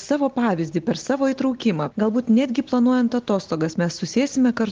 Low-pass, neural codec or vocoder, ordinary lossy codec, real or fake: 7.2 kHz; none; Opus, 16 kbps; real